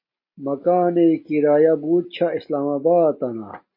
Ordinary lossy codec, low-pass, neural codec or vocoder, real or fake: MP3, 24 kbps; 5.4 kHz; none; real